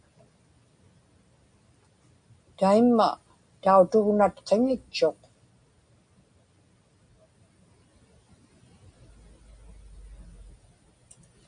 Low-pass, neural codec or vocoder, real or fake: 9.9 kHz; none; real